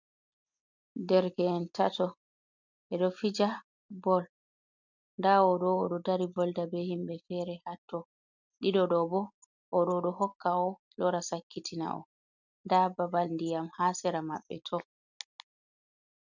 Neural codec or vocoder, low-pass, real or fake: none; 7.2 kHz; real